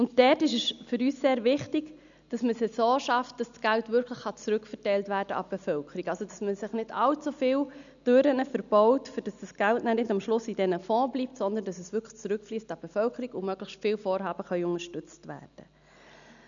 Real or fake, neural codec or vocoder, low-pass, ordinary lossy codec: real; none; 7.2 kHz; none